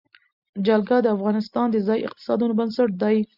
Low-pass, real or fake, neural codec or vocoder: 5.4 kHz; real; none